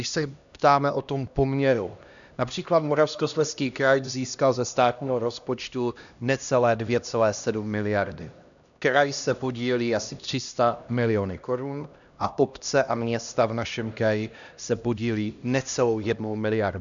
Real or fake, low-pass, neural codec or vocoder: fake; 7.2 kHz; codec, 16 kHz, 1 kbps, X-Codec, HuBERT features, trained on LibriSpeech